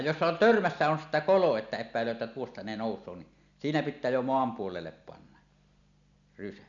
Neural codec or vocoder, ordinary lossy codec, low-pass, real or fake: none; none; 7.2 kHz; real